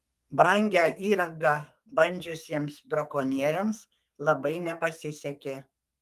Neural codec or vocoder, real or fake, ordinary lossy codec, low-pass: codec, 32 kHz, 1.9 kbps, SNAC; fake; Opus, 32 kbps; 14.4 kHz